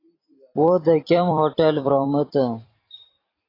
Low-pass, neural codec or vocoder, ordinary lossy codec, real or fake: 5.4 kHz; vocoder, 44.1 kHz, 128 mel bands every 256 samples, BigVGAN v2; AAC, 24 kbps; fake